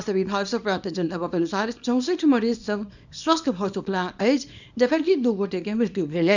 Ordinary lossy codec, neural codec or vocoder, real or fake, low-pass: none; codec, 24 kHz, 0.9 kbps, WavTokenizer, small release; fake; 7.2 kHz